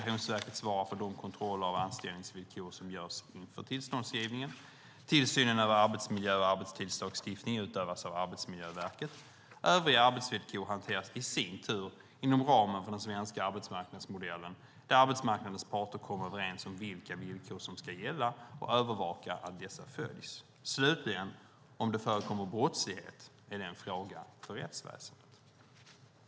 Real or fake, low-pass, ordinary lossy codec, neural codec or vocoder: real; none; none; none